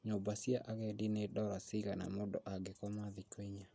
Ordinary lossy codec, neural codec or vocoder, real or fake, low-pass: none; none; real; none